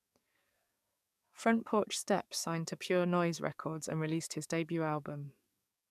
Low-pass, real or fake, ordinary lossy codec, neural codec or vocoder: 14.4 kHz; fake; none; codec, 44.1 kHz, 7.8 kbps, DAC